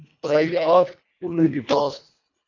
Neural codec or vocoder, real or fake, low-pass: codec, 24 kHz, 1.5 kbps, HILCodec; fake; 7.2 kHz